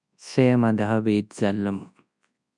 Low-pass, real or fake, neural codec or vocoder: 10.8 kHz; fake; codec, 24 kHz, 0.9 kbps, WavTokenizer, large speech release